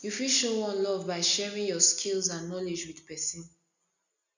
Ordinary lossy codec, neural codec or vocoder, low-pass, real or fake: none; none; 7.2 kHz; real